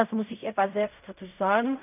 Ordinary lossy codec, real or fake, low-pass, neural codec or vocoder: none; fake; 3.6 kHz; codec, 16 kHz in and 24 kHz out, 0.4 kbps, LongCat-Audio-Codec, fine tuned four codebook decoder